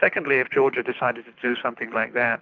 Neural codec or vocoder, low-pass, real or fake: codec, 24 kHz, 6 kbps, HILCodec; 7.2 kHz; fake